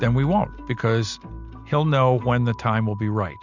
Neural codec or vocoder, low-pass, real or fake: none; 7.2 kHz; real